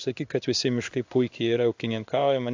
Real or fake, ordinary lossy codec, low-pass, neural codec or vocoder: fake; AAC, 48 kbps; 7.2 kHz; codec, 16 kHz in and 24 kHz out, 1 kbps, XY-Tokenizer